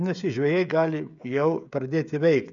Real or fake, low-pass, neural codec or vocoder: fake; 7.2 kHz; codec, 16 kHz, 16 kbps, FreqCodec, smaller model